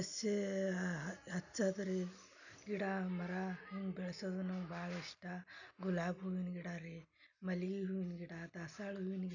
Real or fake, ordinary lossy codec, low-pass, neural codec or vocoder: real; none; 7.2 kHz; none